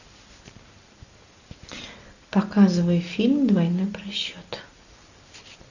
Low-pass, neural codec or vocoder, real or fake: 7.2 kHz; none; real